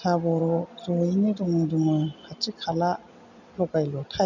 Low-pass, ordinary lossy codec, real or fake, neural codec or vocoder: 7.2 kHz; none; real; none